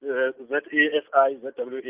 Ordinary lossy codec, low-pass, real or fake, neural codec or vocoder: Opus, 24 kbps; 3.6 kHz; real; none